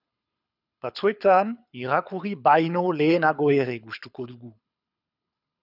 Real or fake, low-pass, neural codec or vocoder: fake; 5.4 kHz; codec, 24 kHz, 6 kbps, HILCodec